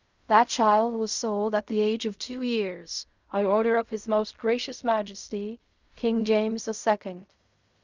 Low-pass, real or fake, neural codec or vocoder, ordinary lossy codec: 7.2 kHz; fake; codec, 16 kHz in and 24 kHz out, 0.4 kbps, LongCat-Audio-Codec, fine tuned four codebook decoder; Opus, 64 kbps